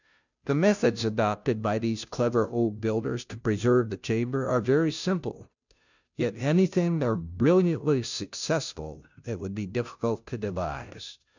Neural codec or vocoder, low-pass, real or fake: codec, 16 kHz, 0.5 kbps, FunCodec, trained on Chinese and English, 25 frames a second; 7.2 kHz; fake